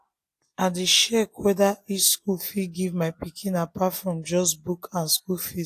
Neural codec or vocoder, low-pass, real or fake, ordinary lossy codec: none; 14.4 kHz; real; AAC, 64 kbps